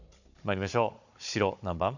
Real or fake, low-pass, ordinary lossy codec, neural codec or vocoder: fake; 7.2 kHz; none; codec, 16 kHz, 16 kbps, FunCodec, trained on Chinese and English, 50 frames a second